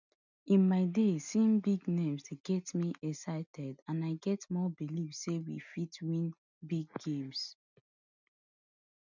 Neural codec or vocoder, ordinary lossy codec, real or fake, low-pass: none; none; real; 7.2 kHz